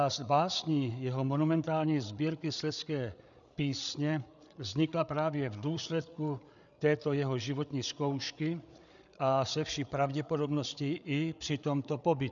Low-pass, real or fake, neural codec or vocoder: 7.2 kHz; fake; codec, 16 kHz, 8 kbps, FreqCodec, larger model